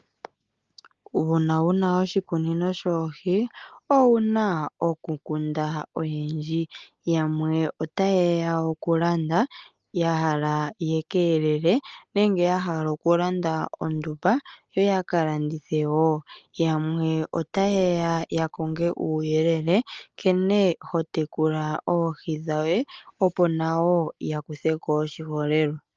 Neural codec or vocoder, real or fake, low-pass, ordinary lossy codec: none; real; 7.2 kHz; Opus, 24 kbps